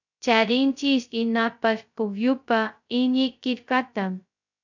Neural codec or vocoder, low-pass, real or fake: codec, 16 kHz, 0.2 kbps, FocalCodec; 7.2 kHz; fake